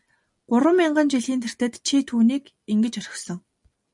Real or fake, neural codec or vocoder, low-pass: real; none; 10.8 kHz